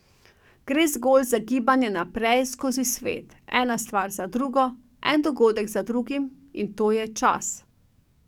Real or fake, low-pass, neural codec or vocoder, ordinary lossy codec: fake; 19.8 kHz; codec, 44.1 kHz, 7.8 kbps, DAC; none